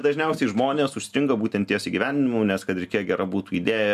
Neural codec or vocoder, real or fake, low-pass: none; real; 14.4 kHz